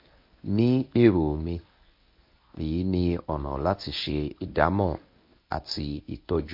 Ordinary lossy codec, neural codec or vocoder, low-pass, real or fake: MP3, 32 kbps; codec, 24 kHz, 0.9 kbps, WavTokenizer, medium speech release version 1; 5.4 kHz; fake